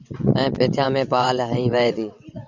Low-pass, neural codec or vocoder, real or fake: 7.2 kHz; vocoder, 22.05 kHz, 80 mel bands, WaveNeXt; fake